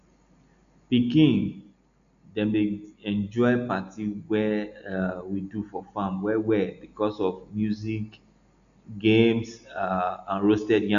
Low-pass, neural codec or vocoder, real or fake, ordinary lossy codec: 7.2 kHz; none; real; none